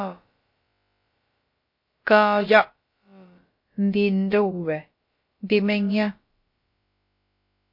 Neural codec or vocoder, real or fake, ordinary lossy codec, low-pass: codec, 16 kHz, about 1 kbps, DyCAST, with the encoder's durations; fake; MP3, 32 kbps; 5.4 kHz